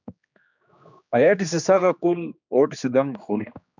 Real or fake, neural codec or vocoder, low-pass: fake; codec, 16 kHz, 2 kbps, X-Codec, HuBERT features, trained on general audio; 7.2 kHz